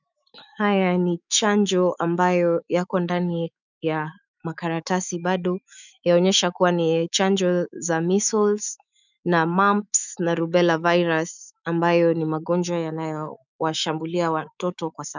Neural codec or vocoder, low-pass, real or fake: autoencoder, 48 kHz, 128 numbers a frame, DAC-VAE, trained on Japanese speech; 7.2 kHz; fake